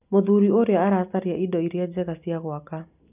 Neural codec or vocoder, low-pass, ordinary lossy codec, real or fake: none; 3.6 kHz; none; real